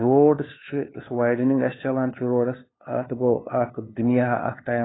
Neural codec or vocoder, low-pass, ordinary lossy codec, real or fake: codec, 16 kHz, 2 kbps, FunCodec, trained on LibriTTS, 25 frames a second; 7.2 kHz; AAC, 16 kbps; fake